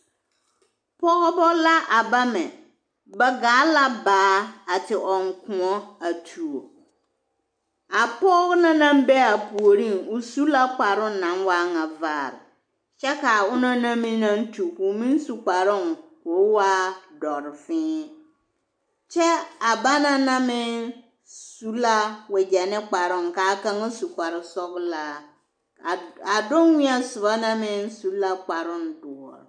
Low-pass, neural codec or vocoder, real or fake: 9.9 kHz; none; real